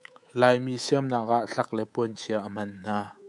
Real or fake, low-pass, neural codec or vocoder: fake; 10.8 kHz; codec, 24 kHz, 3.1 kbps, DualCodec